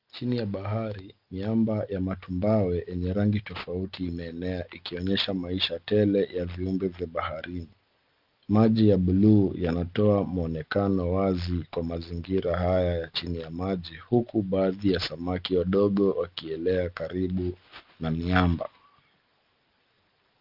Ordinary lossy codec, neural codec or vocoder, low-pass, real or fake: Opus, 32 kbps; none; 5.4 kHz; real